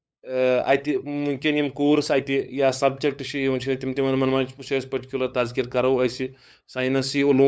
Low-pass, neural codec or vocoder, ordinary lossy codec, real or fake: none; codec, 16 kHz, 8 kbps, FunCodec, trained on LibriTTS, 25 frames a second; none; fake